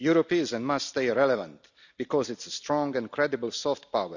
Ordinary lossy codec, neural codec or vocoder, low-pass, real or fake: none; none; 7.2 kHz; real